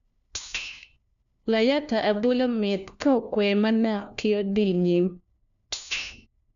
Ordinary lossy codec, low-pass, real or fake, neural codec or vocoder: none; 7.2 kHz; fake; codec, 16 kHz, 1 kbps, FunCodec, trained on LibriTTS, 50 frames a second